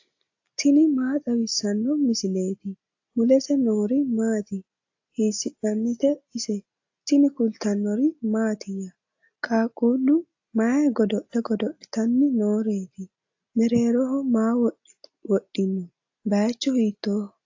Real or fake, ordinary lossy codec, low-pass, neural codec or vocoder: real; AAC, 48 kbps; 7.2 kHz; none